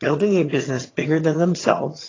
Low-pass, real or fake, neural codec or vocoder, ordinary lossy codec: 7.2 kHz; fake; vocoder, 22.05 kHz, 80 mel bands, HiFi-GAN; AAC, 32 kbps